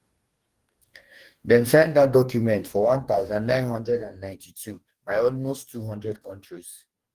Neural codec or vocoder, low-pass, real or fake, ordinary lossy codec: codec, 44.1 kHz, 2.6 kbps, DAC; 14.4 kHz; fake; Opus, 24 kbps